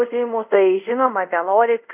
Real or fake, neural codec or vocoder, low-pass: fake; codec, 24 kHz, 0.5 kbps, DualCodec; 3.6 kHz